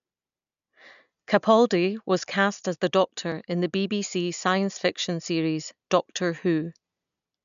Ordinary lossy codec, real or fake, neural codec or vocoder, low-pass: none; real; none; 7.2 kHz